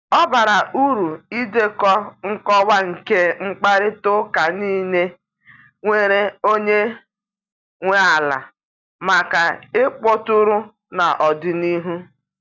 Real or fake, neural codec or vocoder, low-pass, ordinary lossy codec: real; none; 7.2 kHz; none